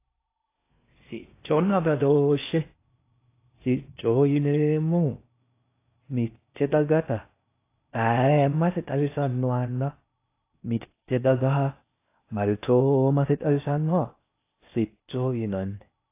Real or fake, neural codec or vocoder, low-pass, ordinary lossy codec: fake; codec, 16 kHz in and 24 kHz out, 0.6 kbps, FocalCodec, streaming, 4096 codes; 3.6 kHz; AAC, 24 kbps